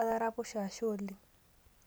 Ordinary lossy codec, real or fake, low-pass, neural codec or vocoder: none; real; none; none